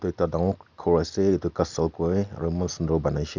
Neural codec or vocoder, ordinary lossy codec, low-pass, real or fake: none; none; 7.2 kHz; real